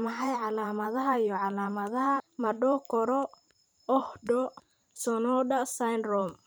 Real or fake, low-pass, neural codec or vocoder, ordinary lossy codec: fake; none; vocoder, 44.1 kHz, 128 mel bands every 512 samples, BigVGAN v2; none